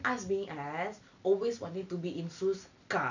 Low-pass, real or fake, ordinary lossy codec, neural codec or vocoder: 7.2 kHz; real; none; none